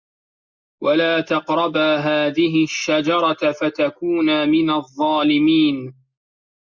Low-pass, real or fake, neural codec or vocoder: 7.2 kHz; real; none